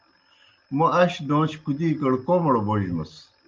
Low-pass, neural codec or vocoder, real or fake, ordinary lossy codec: 7.2 kHz; none; real; Opus, 24 kbps